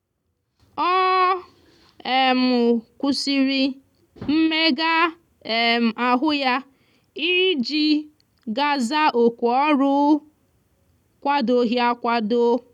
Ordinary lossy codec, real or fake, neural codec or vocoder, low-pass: none; real; none; 19.8 kHz